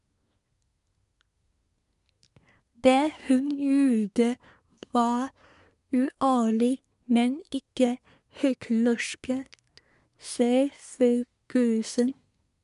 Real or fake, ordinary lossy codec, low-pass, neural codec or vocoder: fake; none; 10.8 kHz; codec, 24 kHz, 1 kbps, SNAC